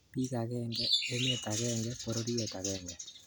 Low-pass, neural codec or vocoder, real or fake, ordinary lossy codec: none; none; real; none